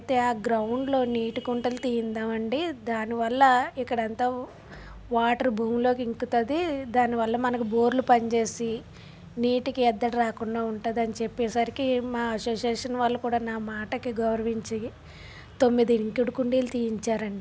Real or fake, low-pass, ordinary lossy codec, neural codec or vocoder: real; none; none; none